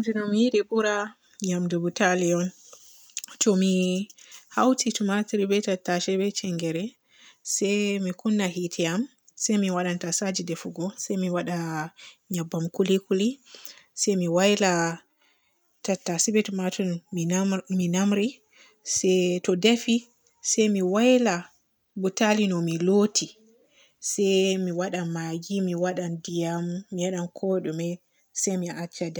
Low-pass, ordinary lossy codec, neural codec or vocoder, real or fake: none; none; none; real